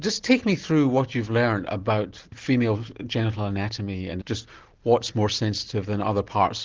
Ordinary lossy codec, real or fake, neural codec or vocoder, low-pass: Opus, 16 kbps; real; none; 7.2 kHz